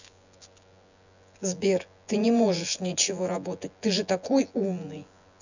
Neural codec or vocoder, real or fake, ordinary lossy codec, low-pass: vocoder, 24 kHz, 100 mel bands, Vocos; fake; none; 7.2 kHz